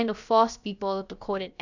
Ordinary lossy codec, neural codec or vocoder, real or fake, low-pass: none; codec, 16 kHz, about 1 kbps, DyCAST, with the encoder's durations; fake; 7.2 kHz